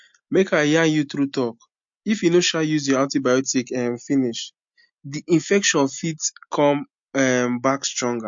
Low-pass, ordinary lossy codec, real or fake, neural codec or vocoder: 7.2 kHz; MP3, 48 kbps; real; none